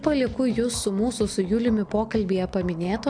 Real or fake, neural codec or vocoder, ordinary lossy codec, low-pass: real; none; AAC, 64 kbps; 9.9 kHz